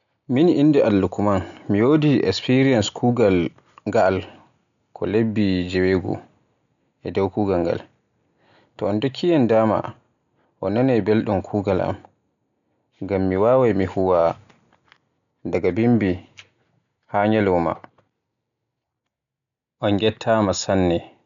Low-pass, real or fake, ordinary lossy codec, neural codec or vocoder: 7.2 kHz; real; none; none